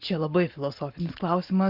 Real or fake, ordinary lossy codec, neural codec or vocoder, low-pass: real; Opus, 32 kbps; none; 5.4 kHz